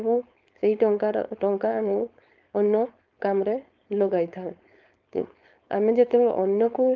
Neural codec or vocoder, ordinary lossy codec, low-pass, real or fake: codec, 16 kHz, 4.8 kbps, FACodec; Opus, 32 kbps; 7.2 kHz; fake